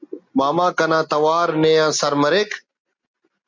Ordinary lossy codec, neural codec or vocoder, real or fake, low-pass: MP3, 64 kbps; none; real; 7.2 kHz